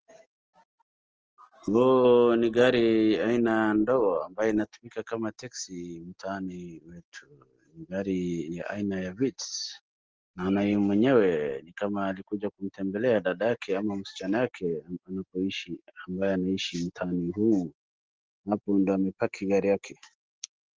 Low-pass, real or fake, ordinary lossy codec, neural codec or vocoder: 7.2 kHz; real; Opus, 16 kbps; none